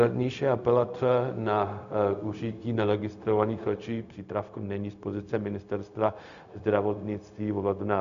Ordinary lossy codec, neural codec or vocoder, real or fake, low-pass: MP3, 96 kbps; codec, 16 kHz, 0.4 kbps, LongCat-Audio-Codec; fake; 7.2 kHz